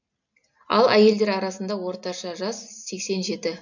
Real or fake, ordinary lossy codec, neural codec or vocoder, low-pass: real; none; none; 7.2 kHz